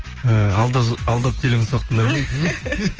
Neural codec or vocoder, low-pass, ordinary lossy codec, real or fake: codec, 16 kHz, 6 kbps, DAC; 7.2 kHz; Opus, 24 kbps; fake